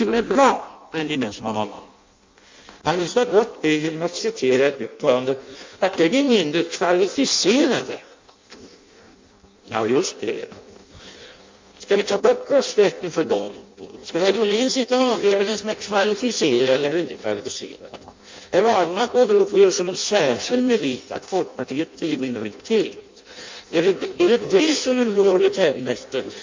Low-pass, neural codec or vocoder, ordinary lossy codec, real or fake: 7.2 kHz; codec, 16 kHz in and 24 kHz out, 0.6 kbps, FireRedTTS-2 codec; MP3, 48 kbps; fake